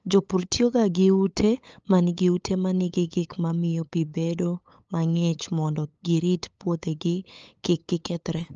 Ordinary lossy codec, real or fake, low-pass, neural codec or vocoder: Opus, 24 kbps; fake; 7.2 kHz; codec, 16 kHz, 8 kbps, FunCodec, trained on LibriTTS, 25 frames a second